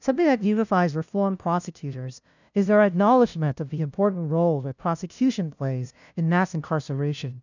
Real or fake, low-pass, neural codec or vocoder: fake; 7.2 kHz; codec, 16 kHz, 0.5 kbps, FunCodec, trained on LibriTTS, 25 frames a second